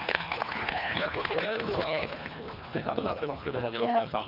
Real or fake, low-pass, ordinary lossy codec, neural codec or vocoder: fake; 5.4 kHz; none; codec, 24 kHz, 1.5 kbps, HILCodec